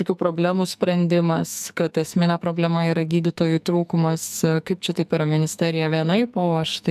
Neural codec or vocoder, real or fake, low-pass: codec, 44.1 kHz, 2.6 kbps, SNAC; fake; 14.4 kHz